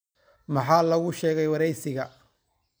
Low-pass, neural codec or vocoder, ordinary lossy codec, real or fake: none; none; none; real